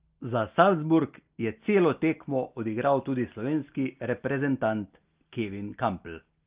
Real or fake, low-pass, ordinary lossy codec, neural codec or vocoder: real; 3.6 kHz; Opus, 32 kbps; none